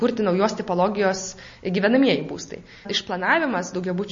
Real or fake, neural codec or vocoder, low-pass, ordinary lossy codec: real; none; 7.2 kHz; MP3, 32 kbps